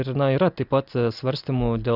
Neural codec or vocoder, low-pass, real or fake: none; 5.4 kHz; real